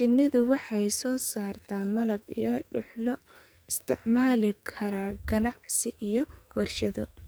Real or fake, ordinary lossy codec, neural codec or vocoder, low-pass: fake; none; codec, 44.1 kHz, 2.6 kbps, SNAC; none